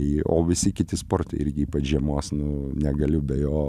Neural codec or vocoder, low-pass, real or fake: none; 14.4 kHz; real